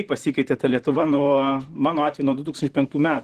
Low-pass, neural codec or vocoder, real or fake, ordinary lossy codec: 14.4 kHz; vocoder, 44.1 kHz, 128 mel bands, Pupu-Vocoder; fake; Opus, 16 kbps